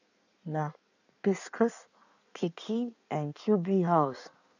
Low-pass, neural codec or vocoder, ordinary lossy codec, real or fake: 7.2 kHz; codec, 16 kHz in and 24 kHz out, 1.1 kbps, FireRedTTS-2 codec; none; fake